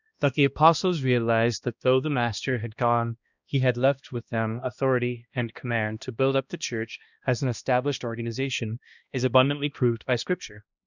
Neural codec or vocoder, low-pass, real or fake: codec, 16 kHz, 1 kbps, X-Codec, HuBERT features, trained on balanced general audio; 7.2 kHz; fake